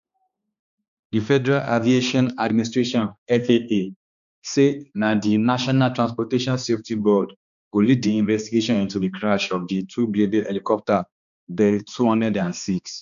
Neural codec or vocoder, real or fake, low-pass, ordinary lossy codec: codec, 16 kHz, 2 kbps, X-Codec, HuBERT features, trained on balanced general audio; fake; 7.2 kHz; none